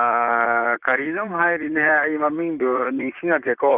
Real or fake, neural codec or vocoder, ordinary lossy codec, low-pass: fake; vocoder, 44.1 kHz, 128 mel bands, Pupu-Vocoder; Opus, 64 kbps; 3.6 kHz